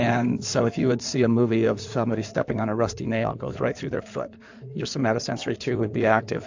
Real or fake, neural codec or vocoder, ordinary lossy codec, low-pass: fake; codec, 16 kHz in and 24 kHz out, 2.2 kbps, FireRedTTS-2 codec; AAC, 48 kbps; 7.2 kHz